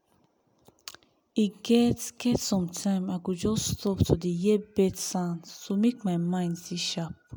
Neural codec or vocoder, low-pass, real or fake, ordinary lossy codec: none; none; real; none